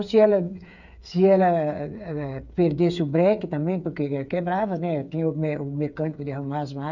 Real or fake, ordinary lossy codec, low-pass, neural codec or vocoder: fake; none; 7.2 kHz; codec, 16 kHz, 8 kbps, FreqCodec, smaller model